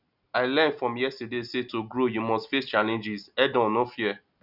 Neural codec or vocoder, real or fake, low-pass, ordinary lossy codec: none; real; 5.4 kHz; none